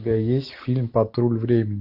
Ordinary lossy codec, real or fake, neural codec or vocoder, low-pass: AAC, 48 kbps; real; none; 5.4 kHz